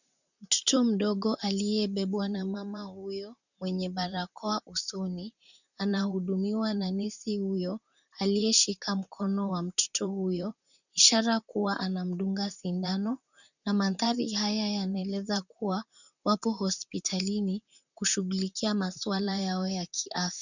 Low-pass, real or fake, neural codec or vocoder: 7.2 kHz; fake; vocoder, 44.1 kHz, 128 mel bands, Pupu-Vocoder